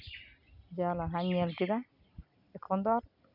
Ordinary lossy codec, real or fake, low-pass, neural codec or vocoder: none; real; 5.4 kHz; none